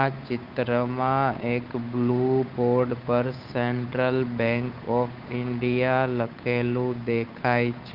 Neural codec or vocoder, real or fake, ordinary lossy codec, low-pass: codec, 16 kHz, 8 kbps, FunCodec, trained on Chinese and English, 25 frames a second; fake; Opus, 32 kbps; 5.4 kHz